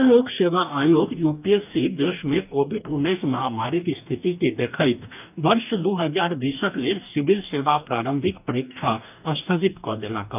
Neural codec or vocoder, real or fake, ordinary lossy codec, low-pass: codec, 44.1 kHz, 2.6 kbps, DAC; fake; none; 3.6 kHz